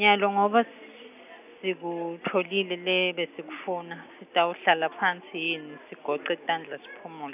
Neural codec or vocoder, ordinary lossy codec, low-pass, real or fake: none; none; 3.6 kHz; real